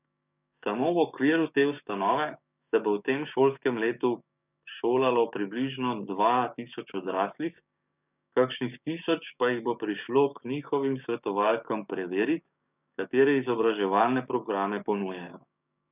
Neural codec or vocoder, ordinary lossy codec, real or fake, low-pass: codec, 16 kHz, 6 kbps, DAC; none; fake; 3.6 kHz